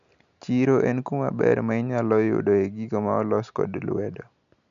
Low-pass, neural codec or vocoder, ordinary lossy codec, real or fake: 7.2 kHz; none; none; real